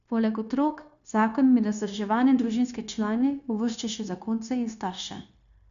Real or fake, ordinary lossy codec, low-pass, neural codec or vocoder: fake; none; 7.2 kHz; codec, 16 kHz, 0.9 kbps, LongCat-Audio-Codec